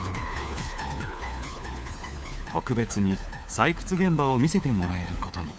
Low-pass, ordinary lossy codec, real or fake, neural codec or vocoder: none; none; fake; codec, 16 kHz, 4 kbps, FunCodec, trained on LibriTTS, 50 frames a second